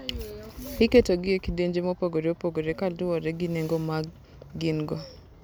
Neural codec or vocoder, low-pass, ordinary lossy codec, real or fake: none; none; none; real